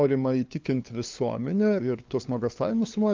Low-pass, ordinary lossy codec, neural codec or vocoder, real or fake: 7.2 kHz; Opus, 24 kbps; codec, 16 kHz, 2 kbps, FunCodec, trained on LibriTTS, 25 frames a second; fake